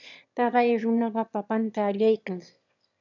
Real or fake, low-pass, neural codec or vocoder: fake; 7.2 kHz; autoencoder, 22.05 kHz, a latent of 192 numbers a frame, VITS, trained on one speaker